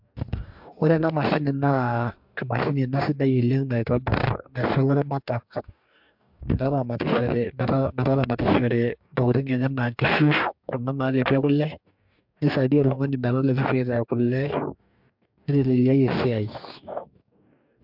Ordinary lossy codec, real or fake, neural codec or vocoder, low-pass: MP3, 48 kbps; fake; codec, 44.1 kHz, 2.6 kbps, DAC; 5.4 kHz